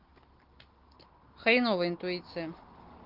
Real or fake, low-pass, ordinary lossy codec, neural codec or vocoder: real; 5.4 kHz; Opus, 16 kbps; none